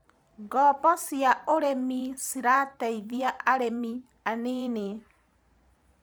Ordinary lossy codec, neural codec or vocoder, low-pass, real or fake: none; vocoder, 44.1 kHz, 128 mel bands every 512 samples, BigVGAN v2; none; fake